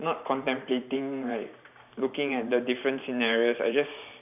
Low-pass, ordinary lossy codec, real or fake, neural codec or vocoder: 3.6 kHz; none; fake; vocoder, 44.1 kHz, 128 mel bands every 512 samples, BigVGAN v2